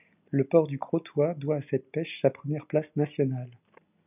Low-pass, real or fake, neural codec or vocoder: 3.6 kHz; real; none